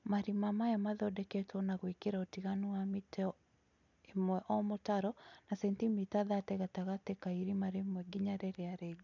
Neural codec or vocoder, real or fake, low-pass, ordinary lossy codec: none; real; 7.2 kHz; none